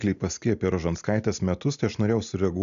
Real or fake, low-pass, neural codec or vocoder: real; 7.2 kHz; none